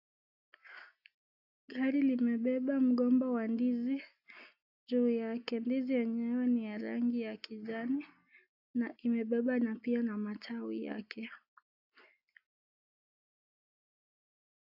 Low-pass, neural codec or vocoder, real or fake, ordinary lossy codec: 5.4 kHz; none; real; AAC, 48 kbps